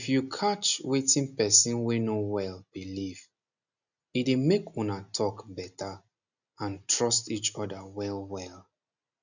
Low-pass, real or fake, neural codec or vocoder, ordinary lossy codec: 7.2 kHz; real; none; none